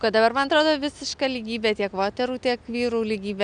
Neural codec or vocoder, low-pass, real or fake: none; 9.9 kHz; real